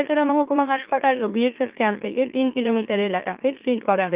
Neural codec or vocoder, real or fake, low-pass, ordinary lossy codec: autoencoder, 44.1 kHz, a latent of 192 numbers a frame, MeloTTS; fake; 3.6 kHz; Opus, 24 kbps